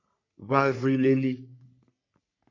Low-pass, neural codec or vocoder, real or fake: 7.2 kHz; codec, 44.1 kHz, 3.4 kbps, Pupu-Codec; fake